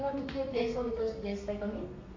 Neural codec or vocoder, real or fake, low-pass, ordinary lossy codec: codec, 32 kHz, 1.9 kbps, SNAC; fake; 7.2 kHz; AAC, 32 kbps